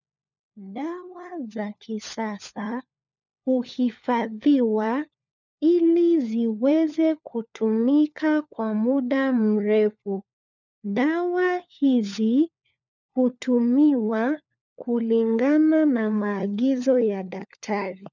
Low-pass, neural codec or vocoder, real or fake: 7.2 kHz; codec, 16 kHz, 4 kbps, FunCodec, trained on LibriTTS, 50 frames a second; fake